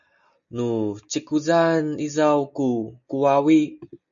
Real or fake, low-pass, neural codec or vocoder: real; 7.2 kHz; none